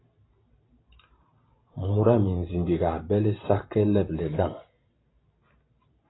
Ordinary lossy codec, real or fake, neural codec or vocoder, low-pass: AAC, 16 kbps; fake; vocoder, 44.1 kHz, 128 mel bands every 512 samples, BigVGAN v2; 7.2 kHz